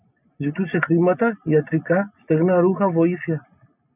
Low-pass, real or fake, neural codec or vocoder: 3.6 kHz; real; none